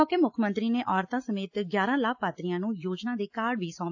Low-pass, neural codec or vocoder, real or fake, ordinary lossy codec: 7.2 kHz; none; real; AAC, 48 kbps